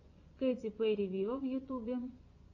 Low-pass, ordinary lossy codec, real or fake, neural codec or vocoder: 7.2 kHz; AAC, 48 kbps; fake; vocoder, 24 kHz, 100 mel bands, Vocos